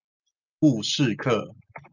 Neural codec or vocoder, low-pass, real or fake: none; 7.2 kHz; real